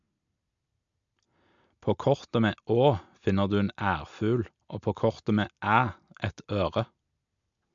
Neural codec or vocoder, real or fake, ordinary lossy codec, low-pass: none; real; MP3, 64 kbps; 7.2 kHz